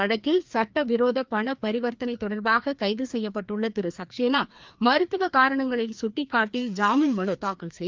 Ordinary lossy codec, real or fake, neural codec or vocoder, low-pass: Opus, 32 kbps; fake; codec, 16 kHz, 2 kbps, FreqCodec, larger model; 7.2 kHz